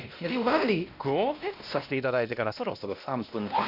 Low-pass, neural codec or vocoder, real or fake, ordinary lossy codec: 5.4 kHz; codec, 16 kHz, 1 kbps, X-Codec, WavLM features, trained on Multilingual LibriSpeech; fake; none